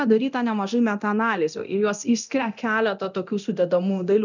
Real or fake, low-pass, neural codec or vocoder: fake; 7.2 kHz; codec, 24 kHz, 0.9 kbps, DualCodec